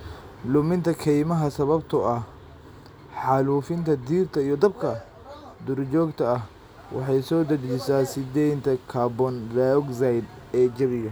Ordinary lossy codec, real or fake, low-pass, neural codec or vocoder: none; real; none; none